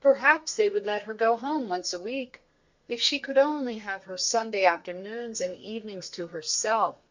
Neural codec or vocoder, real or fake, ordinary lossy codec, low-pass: codec, 32 kHz, 1.9 kbps, SNAC; fake; MP3, 64 kbps; 7.2 kHz